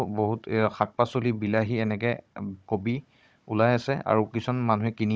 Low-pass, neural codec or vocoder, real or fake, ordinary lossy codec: none; codec, 16 kHz, 16 kbps, FunCodec, trained on Chinese and English, 50 frames a second; fake; none